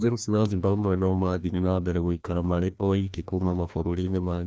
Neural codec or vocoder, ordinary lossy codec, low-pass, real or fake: codec, 16 kHz, 1 kbps, FreqCodec, larger model; none; none; fake